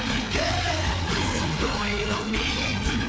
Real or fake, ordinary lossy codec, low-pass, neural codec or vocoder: fake; none; none; codec, 16 kHz, 4 kbps, FreqCodec, larger model